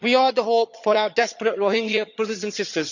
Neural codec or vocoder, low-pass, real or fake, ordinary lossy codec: vocoder, 22.05 kHz, 80 mel bands, HiFi-GAN; 7.2 kHz; fake; none